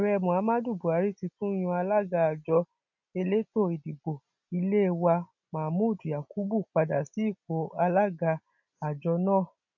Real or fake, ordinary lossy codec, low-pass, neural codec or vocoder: real; none; 7.2 kHz; none